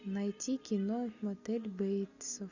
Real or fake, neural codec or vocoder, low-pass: real; none; 7.2 kHz